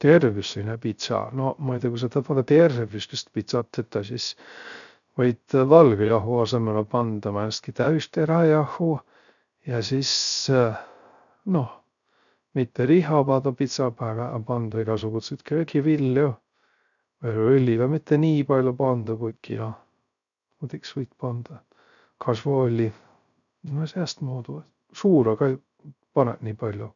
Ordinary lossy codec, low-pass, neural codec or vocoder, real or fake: none; 7.2 kHz; codec, 16 kHz, 0.3 kbps, FocalCodec; fake